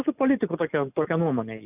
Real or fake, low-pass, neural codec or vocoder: real; 3.6 kHz; none